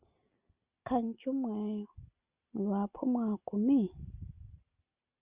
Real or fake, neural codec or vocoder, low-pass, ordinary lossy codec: real; none; 3.6 kHz; Opus, 64 kbps